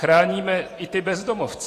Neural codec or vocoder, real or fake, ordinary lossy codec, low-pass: vocoder, 44.1 kHz, 128 mel bands every 512 samples, BigVGAN v2; fake; AAC, 48 kbps; 14.4 kHz